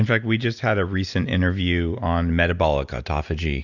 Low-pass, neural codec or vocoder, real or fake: 7.2 kHz; none; real